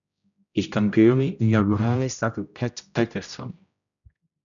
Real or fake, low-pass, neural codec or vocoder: fake; 7.2 kHz; codec, 16 kHz, 0.5 kbps, X-Codec, HuBERT features, trained on general audio